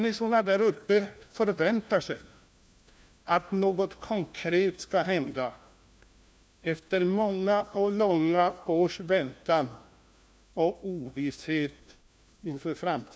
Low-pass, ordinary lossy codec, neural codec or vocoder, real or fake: none; none; codec, 16 kHz, 1 kbps, FunCodec, trained on LibriTTS, 50 frames a second; fake